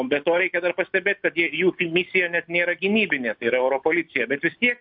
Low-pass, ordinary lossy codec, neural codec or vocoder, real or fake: 5.4 kHz; MP3, 48 kbps; none; real